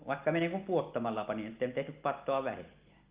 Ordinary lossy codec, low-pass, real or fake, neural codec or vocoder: Opus, 32 kbps; 3.6 kHz; real; none